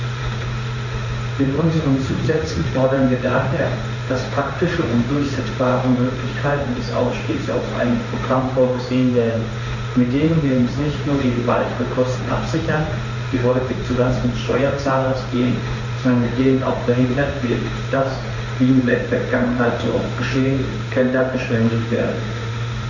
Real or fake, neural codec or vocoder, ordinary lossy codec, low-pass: fake; codec, 16 kHz in and 24 kHz out, 1 kbps, XY-Tokenizer; none; 7.2 kHz